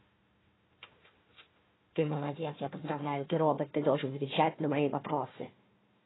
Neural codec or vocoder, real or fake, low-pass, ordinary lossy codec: codec, 16 kHz, 1 kbps, FunCodec, trained on Chinese and English, 50 frames a second; fake; 7.2 kHz; AAC, 16 kbps